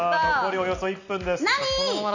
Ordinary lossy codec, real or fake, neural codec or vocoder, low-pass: none; real; none; 7.2 kHz